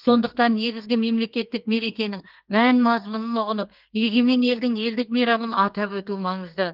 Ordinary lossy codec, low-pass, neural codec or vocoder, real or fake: Opus, 32 kbps; 5.4 kHz; codec, 32 kHz, 1.9 kbps, SNAC; fake